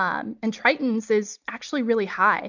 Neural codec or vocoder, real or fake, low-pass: none; real; 7.2 kHz